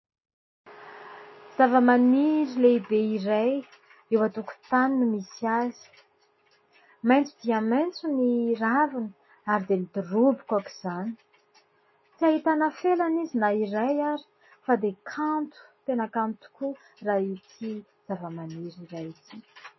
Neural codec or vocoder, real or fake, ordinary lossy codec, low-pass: none; real; MP3, 24 kbps; 7.2 kHz